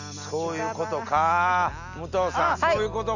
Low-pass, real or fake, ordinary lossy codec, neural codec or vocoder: 7.2 kHz; real; none; none